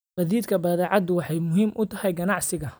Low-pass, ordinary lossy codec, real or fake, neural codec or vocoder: none; none; real; none